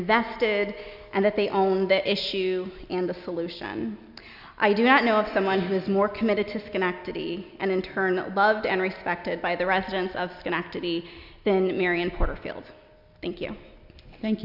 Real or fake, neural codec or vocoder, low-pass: real; none; 5.4 kHz